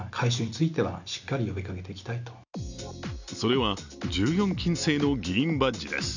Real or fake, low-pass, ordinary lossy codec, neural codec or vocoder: real; 7.2 kHz; none; none